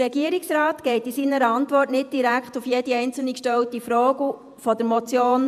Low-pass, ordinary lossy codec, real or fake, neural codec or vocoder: 14.4 kHz; MP3, 96 kbps; fake; vocoder, 48 kHz, 128 mel bands, Vocos